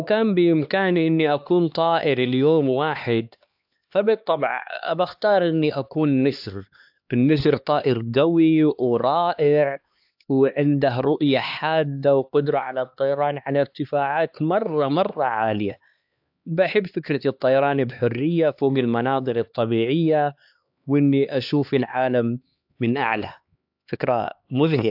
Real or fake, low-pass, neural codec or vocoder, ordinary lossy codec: fake; 5.4 kHz; codec, 16 kHz, 2 kbps, X-Codec, HuBERT features, trained on LibriSpeech; AAC, 48 kbps